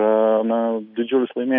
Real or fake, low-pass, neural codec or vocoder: real; 5.4 kHz; none